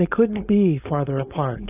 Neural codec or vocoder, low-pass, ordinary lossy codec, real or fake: codec, 16 kHz, 4.8 kbps, FACodec; 3.6 kHz; AAC, 24 kbps; fake